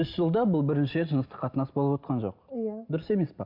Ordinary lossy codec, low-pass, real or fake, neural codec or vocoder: MP3, 48 kbps; 5.4 kHz; real; none